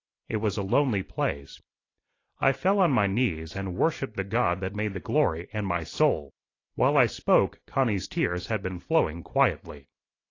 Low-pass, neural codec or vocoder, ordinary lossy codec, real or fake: 7.2 kHz; none; AAC, 32 kbps; real